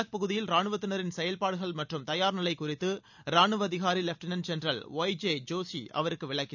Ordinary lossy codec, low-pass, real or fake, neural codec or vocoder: none; 7.2 kHz; real; none